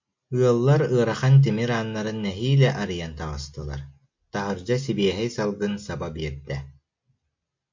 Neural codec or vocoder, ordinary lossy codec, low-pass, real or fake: none; MP3, 48 kbps; 7.2 kHz; real